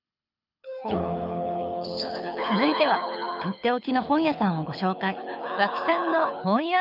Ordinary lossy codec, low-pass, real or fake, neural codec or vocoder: none; 5.4 kHz; fake; codec, 24 kHz, 6 kbps, HILCodec